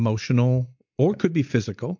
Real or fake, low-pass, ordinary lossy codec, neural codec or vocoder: real; 7.2 kHz; MP3, 64 kbps; none